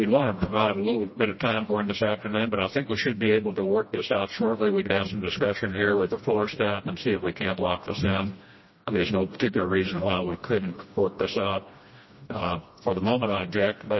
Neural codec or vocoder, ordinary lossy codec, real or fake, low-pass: codec, 16 kHz, 1 kbps, FreqCodec, smaller model; MP3, 24 kbps; fake; 7.2 kHz